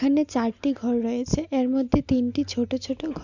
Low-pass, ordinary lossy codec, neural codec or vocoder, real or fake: 7.2 kHz; none; none; real